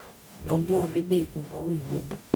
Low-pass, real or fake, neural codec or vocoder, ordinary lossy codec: none; fake; codec, 44.1 kHz, 0.9 kbps, DAC; none